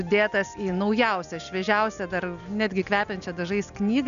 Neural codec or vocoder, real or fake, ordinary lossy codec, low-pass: none; real; MP3, 96 kbps; 7.2 kHz